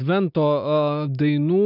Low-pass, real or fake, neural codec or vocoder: 5.4 kHz; real; none